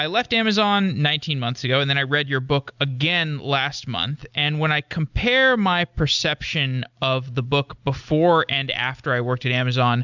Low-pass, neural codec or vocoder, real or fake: 7.2 kHz; none; real